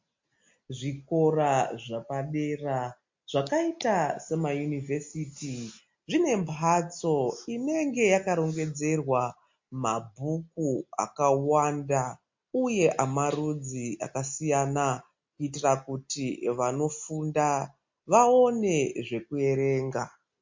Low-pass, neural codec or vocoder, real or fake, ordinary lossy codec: 7.2 kHz; none; real; MP3, 48 kbps